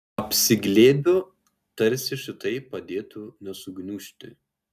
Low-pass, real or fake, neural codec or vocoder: 14.4 kHz; real; none